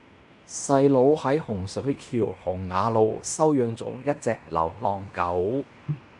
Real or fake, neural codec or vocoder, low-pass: fake; codec, 16 kHz in and 24 kHz out, 0.9 kbps, LongCat-Audio-Codec, fine tuned four codebook decoder; 10.8 kHz